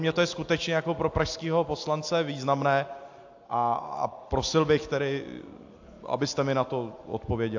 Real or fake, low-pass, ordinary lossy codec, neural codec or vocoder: real; 7.2 kHz; AAC, 48 kbps; none